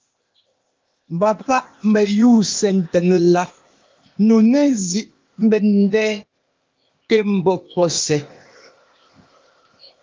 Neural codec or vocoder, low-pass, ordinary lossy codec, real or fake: codec, 16 kHz, 0.8 kbps, ZipCodec; 7.2 kHz; Opus, 24 kbps; fake